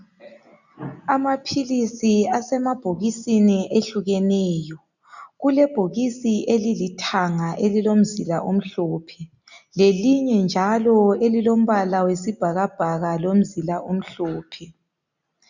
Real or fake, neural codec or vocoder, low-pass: real; none; 7.2 kHz